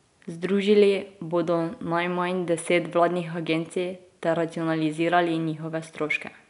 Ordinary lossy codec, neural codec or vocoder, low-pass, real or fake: none; none; 10.8 kHz; real